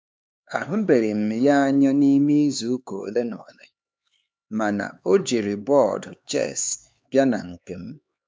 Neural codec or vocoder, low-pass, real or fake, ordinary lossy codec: codec, 16 kHz, 4 kbps, X-Codec, HuBERT features, trained on LibriSpeech; none; fake; none